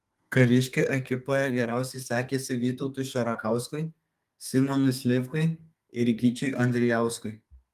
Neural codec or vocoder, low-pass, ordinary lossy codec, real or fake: codec, 32 kHz, 1.9 kbps, SNAC; 14.4 kHz; Opus, 32 kbps; fake